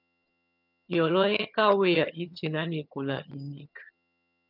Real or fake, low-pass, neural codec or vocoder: fake; 5.4 kHz; vocoder, 22.05 kHz, 80 mel bands, HiFi-GAN